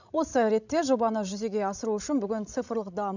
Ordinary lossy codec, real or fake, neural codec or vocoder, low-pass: none; fake; codec, 16 kHz, 16 kbps, FreqCodec, larger model; 7.2 kHz